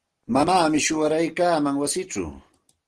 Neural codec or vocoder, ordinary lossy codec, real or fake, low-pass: none; Opus, 16 kbps; real; 10.8 kHz